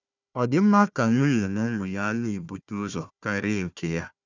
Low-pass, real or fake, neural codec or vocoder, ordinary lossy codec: 7.2 kHz; fake; codec, 16 kHz, 1 kbps, FunCodec, trained on Chinese and English, 50 frames a second; none